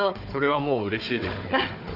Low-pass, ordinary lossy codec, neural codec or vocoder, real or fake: 5.4 kHz; none; codec, 16 kHz, 4 kbps, FunCodec, trained on Chinese and English, 50 frames a second; fake